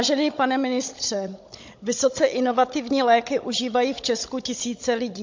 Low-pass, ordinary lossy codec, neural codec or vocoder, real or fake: 7.2 kHz; MP3, 48 kbps; codec, 16 kHz, 16 kbps, FunCodec, trained on Chinese and English, 50 frames a second; fake